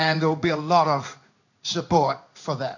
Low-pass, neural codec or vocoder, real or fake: 7.2 kHz; codec, 16 kHz in and 24 kHz out, 1 kbps, XY-Tokenizer; fake